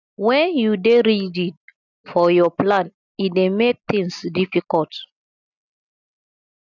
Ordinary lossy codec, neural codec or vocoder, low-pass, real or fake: none; none; 7.2 kHz; real